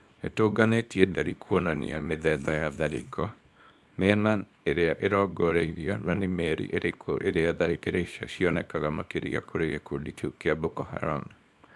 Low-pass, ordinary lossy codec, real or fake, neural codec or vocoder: none; none; fake; codec, 24 kHz, 0.9 kbps, WavTokenizer, small release